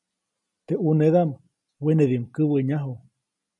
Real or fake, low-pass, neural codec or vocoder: real; 10.8 kHz; none